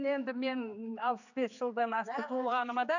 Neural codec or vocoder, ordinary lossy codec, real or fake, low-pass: codec, 16 kHz, 4 kbps, X-Codec, HuBERT features, trained on general audio; none; fake; 7.2 kHz